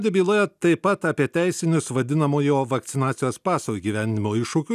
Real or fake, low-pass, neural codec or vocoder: real; 14.4 kHz; none